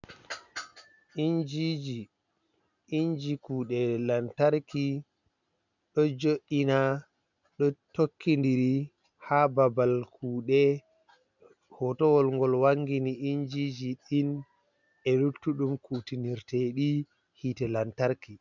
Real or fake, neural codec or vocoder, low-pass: real; none; 7.2 kHz